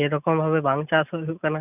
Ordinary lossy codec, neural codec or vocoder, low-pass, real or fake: none; none; 3.6 kHz; real